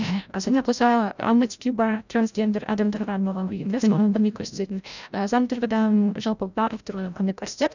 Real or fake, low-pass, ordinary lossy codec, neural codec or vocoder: fake; 7.2 kHz; none; codec, 16 kHz, 0.5 kbps, FreqCodec, larger model